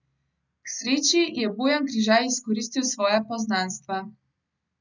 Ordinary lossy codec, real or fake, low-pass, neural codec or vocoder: none; real; 7.2 kHz; none